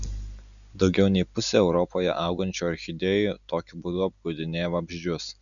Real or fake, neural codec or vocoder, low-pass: real; none; 7.2 kHz